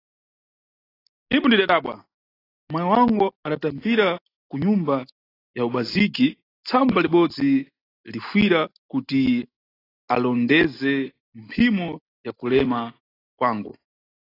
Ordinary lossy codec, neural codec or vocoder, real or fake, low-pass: AAC, 24 kbps; none; real; 5.4 kHz